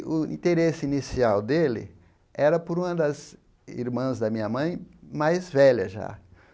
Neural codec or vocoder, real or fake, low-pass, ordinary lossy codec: none; real; none; none